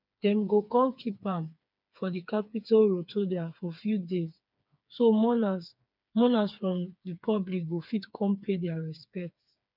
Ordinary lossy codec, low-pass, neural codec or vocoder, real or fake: none; 5.4 kHz; codec, 16 kHz, 4 kbps, FreqCodec, smaller model; fake